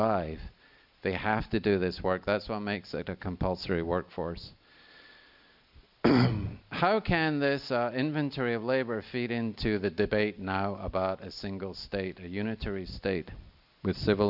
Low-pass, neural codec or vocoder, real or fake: 5.4 kHz; none; real